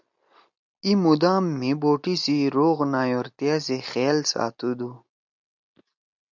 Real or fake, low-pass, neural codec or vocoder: real; 7.2 kHz; none